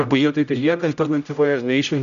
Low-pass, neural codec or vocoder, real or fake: 7.2 kHz; codec, 16 kHz, 0.5 kbps, X-Codec, HuBERT features, trained on general audio; fake